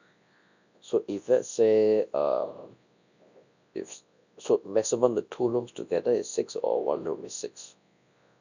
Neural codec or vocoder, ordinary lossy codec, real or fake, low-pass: codec, 24 kHz, 0.9 kbps, WavTokenizer, large speech release; none; fake; 7.2 kHz